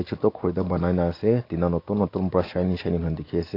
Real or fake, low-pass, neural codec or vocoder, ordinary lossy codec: real; 5.4 kHz; none; AAC, 32 kbps